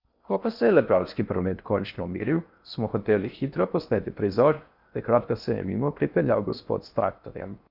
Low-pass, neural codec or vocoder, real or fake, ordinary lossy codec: 5.4 kHz; codec, 16 kHz in and 24 kHz out, 0.6 kbps, FocalCodec, streaming, 4096 codes; fake; Opus, 64 kbps